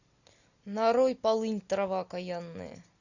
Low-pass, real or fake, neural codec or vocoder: 7.2 kHz; real; none